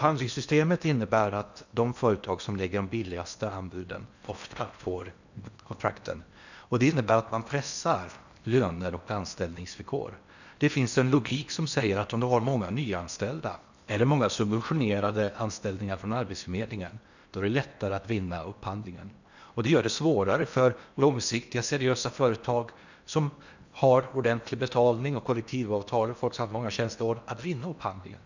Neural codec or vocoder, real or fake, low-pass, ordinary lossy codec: codec, 16 kHz in and 24 kHz out, 0.8 kbps, FocalCodec, streaming, 65536 codes; fake; 7.2 kHz; none